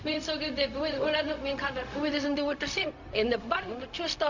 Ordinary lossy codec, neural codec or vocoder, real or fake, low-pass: none; codec, 16 kHz, 0.4 kbps, LongCat-Audio-Codec; fake; 7.2 kHz